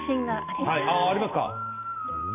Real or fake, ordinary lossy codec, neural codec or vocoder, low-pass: real; MP3, 24 kbps; none; 3.6 kHz